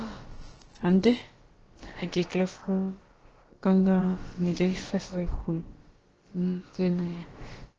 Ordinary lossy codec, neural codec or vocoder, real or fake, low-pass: Opus, 16 kbps; codec, 16 kHz, about 1 kbps, DyCAST, with the encoder's durations; fake; 7.2 kHz